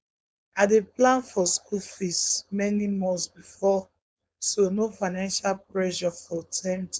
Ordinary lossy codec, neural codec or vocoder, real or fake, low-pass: none; codec, 16 kHz, 4.8 kbps, FACodec; fake; none